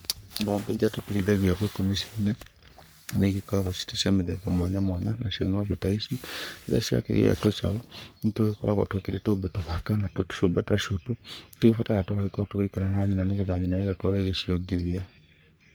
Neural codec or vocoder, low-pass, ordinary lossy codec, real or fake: codec, 44.1 kHz, 3.4 kbps, Pupu-Codec; none; none; fake